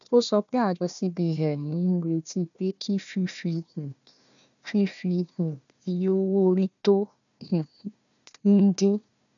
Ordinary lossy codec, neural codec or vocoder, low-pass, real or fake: none; codec, 16 kHz, 1 kbps, FunCodec, trained on Chinese and English, 50 frames a second; 7.2 kHz; fake